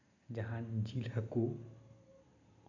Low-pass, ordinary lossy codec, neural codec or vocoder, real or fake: 7.2 kHz; none; none; real